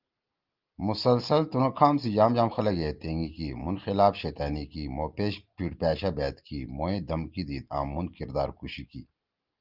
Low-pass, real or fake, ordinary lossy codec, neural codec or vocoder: 5.4 kHz; real; Opus, 32 kbps; none